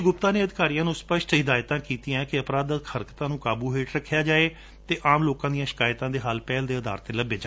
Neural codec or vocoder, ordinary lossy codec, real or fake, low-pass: none; none; real; none